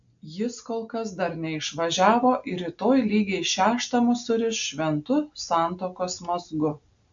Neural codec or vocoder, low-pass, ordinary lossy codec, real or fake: none; 7.2 kHz; AAC, 64 kbps; real